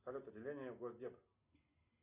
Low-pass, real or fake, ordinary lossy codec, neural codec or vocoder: 3.6 kHz; real; Opus, 64 kbps; none